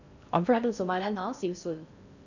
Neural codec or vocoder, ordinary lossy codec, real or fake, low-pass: codec, 16 kHz in and 24 kHz out, 0.6 kbps, FocalCodec, streaming, 4096 codes; none; fake; 7.2 kHz